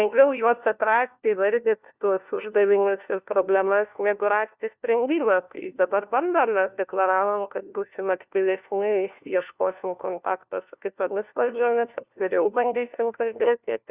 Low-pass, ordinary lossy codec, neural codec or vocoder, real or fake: 3.6 kHz; Opus, 64 kbps; codec, 16 kHz, 1 kbps, FunCodec, trained on LibriTTS, 50 frames a second; fake